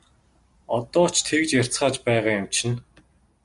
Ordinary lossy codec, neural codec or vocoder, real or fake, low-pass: AAC, 96 kbps; none; real; 10.8 kHz